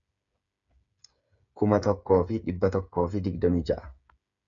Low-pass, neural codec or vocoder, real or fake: 7.2 kHz; codec, 16 kHz, 8 kbps, FreqCodec, smaller model; fake